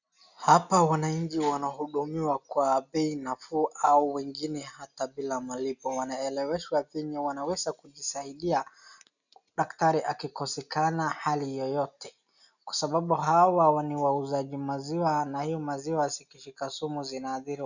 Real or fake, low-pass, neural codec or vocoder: real; 7.2 kHz; none